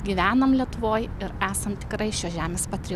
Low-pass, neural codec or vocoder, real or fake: 14.4 kHz; none; real